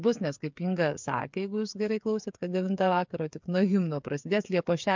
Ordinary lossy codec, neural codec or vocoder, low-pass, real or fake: MP3, 64 kbps; codec, 16 kHz, 8 kbps, FreqCodec, smaller model; 7.2 kHz; fake